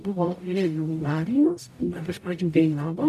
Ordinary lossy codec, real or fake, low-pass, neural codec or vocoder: MP3, 96 kbps; fake; 14.4 kHz; codec, 44.1 kHz, 0.9 kbps, DAC